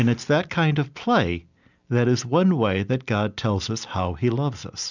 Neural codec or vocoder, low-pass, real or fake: none; 7.2 kHz; real